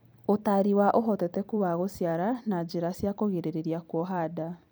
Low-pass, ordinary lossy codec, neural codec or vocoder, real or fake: none; none; vocoder, 44.1 kHz, 128 mel bands every 256 samples, BigVGAN v2; fake